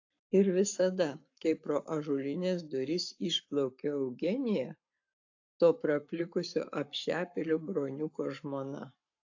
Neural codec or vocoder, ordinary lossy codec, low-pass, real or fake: codec, 44.1 kHz, 7.8 kbps, Pupu-Codec; AAC, 48 kbps; 7.2 kHz; fake